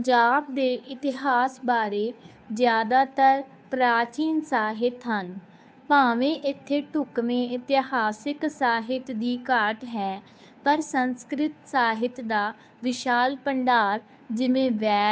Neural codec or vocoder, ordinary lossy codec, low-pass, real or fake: codec, 16 kHz, 2 kbps, FunCodec, trained on Chinese and English, 25 frames a second; none; none; fake